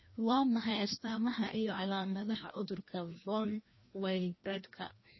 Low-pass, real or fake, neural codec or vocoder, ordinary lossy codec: 7.2 kHz; fake; codec, 16 kHz, 1 kbps, FreqCodec, larger model; MP3, 24 kbps